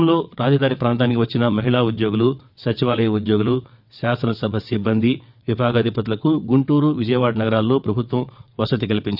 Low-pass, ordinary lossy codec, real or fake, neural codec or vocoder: 5.4 kHz; none; fake; vocoder, 22.05 kHz, 80 mel bands, WaveNeXt